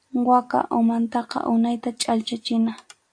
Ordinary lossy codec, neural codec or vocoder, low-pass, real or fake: AAC, 64 kbps; none; 9.9 kHz; real